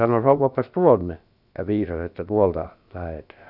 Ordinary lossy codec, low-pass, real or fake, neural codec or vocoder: none; 5.4 kHz; fake; codec, 16 kHz, about 1 kbps, DyCAST, with the encoder's durations